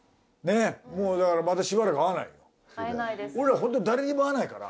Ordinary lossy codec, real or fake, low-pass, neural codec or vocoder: none; real; none; none